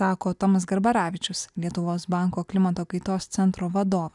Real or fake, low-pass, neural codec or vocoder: real; 10.8 kHz; none